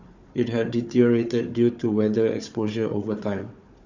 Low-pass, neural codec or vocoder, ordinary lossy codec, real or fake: 7.2 kHz; codec, 16 kHz, 4 kbps, FunCodec, trained on Chinese and English, 50 frames a second; Opus, 64 kbps; fake